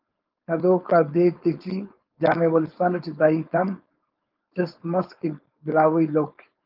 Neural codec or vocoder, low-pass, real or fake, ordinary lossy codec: codec, 16 kHz, 4.8 kbps, FACodec; 5.4 kHz; fake; Opus, 32 kbps